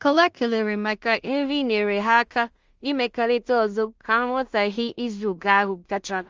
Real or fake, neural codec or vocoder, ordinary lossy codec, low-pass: fake; codec, 16 kHz in and 24 kHz out, 0.4 kbps, LongCat-Audio-Codec, two codebook decoder; Opus, 32 kbps; 7.2 kHz